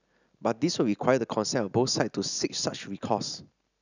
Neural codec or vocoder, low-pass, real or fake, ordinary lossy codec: none; 7.2 kHz; real; none